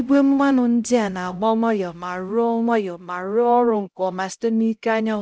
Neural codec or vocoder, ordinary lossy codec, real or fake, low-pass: codec, 16 kHz, 0.5 kbps, X-Codec, HuBERT features, trained on LibriSpeech; none; fake; none